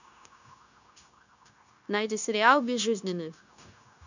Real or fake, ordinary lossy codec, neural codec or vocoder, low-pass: fake; none; codec, 16 kHz, 0.9 kbps, LongCat-Audio-Codec; 7.2 kHz